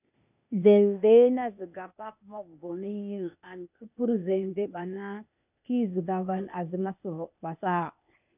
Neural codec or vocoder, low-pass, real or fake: codec, 16 kHz, 0.8 kbps, ZipCodec; 3.6 kHz; fake